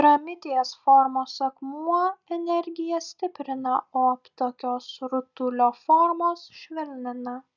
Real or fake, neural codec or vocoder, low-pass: real; none; 7.2 kHz